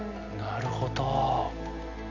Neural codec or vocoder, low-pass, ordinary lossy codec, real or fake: none; 7.2 kHz; none; real